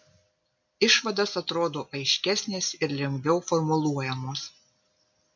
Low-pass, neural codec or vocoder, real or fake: 7.2 kHz; none; real